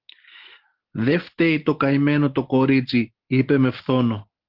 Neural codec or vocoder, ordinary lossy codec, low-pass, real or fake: none; Opus, 16 kbps; 5.4 kHz; real